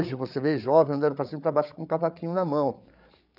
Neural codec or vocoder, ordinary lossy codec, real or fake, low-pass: codec, 16 kHz, 8 kbps, FreqCodec, larger model; none; fake; 5.4 kHz